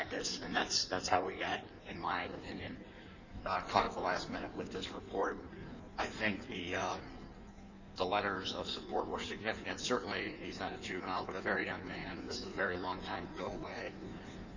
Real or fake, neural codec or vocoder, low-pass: fake; codec, 16 kHz in and 24 kHz out, 1.1 kbps, FireRedTTS-2 codec; 7.2 kHz